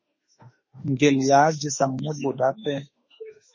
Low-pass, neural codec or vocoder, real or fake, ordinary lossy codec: 7.2 kHz; autoencoder, 48 kHz, 32 numbers a frame, DAC-VAE, trained on Japanese speech; fake; MP3, 32 kbps